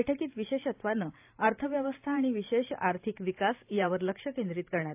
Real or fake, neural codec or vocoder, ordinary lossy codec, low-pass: fake; vocoder, 44.1 kHz, 128 mel bands every 512 samples, BigVGAN v2; AAC, 32 kbps; 3.6 kHz